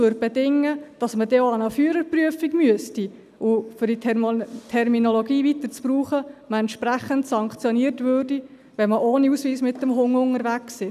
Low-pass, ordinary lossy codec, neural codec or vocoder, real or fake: 14.4 kHz; none; none; real